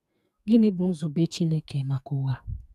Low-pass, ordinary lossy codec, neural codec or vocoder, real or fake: 14.4 kHz; none; codec, 44.1 kHz, 2.6 kbps, SNAC; fake